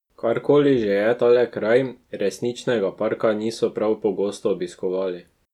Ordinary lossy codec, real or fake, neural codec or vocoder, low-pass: none; real; none; 19.8 kHz